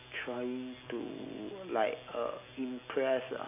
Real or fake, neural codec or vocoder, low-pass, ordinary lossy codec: real; none; 3.6 kHz; none